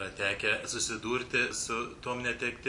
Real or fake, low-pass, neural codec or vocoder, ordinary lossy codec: real; 10.8 kHz; none; MP3, 96 kbps